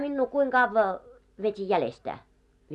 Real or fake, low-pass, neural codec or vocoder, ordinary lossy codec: real; none; none; none